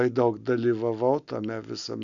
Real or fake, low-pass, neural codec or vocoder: real; 7.2 kHz; none